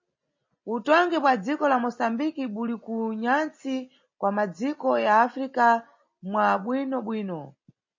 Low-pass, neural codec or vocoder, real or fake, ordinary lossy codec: 7.2 kHz; none; real; MP3, 32 kbps